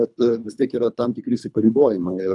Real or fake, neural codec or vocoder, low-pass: fake; codec, 24 kHz, 3 kbps, HILCodec; 10.8 kHz